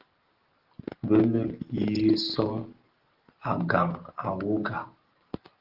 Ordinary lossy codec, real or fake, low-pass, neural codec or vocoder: Opus, 16 kbps; real; 5.4 kHz; none